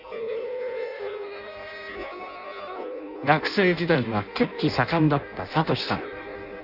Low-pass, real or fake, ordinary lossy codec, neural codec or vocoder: 5.4 kHz; fake; none; codec, 16 kHz in and 24 kHz out, 0.6 kbps, FireRedTTS-2 codec